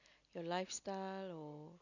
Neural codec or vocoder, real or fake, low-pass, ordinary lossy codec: none; real; 7.2 kHz; none